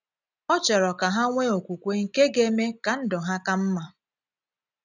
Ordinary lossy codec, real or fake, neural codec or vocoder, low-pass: none; real; none; 7.2 kHz